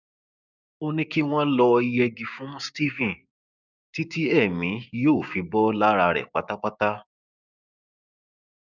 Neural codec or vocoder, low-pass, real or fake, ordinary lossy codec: codec, 16 kHz, 6 kbps, DAC; 7.2 kHz; fake; none